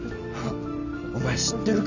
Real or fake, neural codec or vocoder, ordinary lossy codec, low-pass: real; none; none; 7.2 kHz